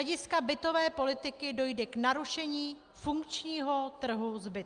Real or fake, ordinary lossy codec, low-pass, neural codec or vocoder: real; Opus, 32 kbps; 9.9 kHz; none